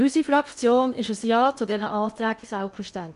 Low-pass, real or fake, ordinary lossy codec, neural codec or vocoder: 10.8 kHz; fake; MP3, 96 kbps; codec, 16 kHz in and 24 kHz out, 0.8 kbps, FocalCodec, streaming, 65536 codes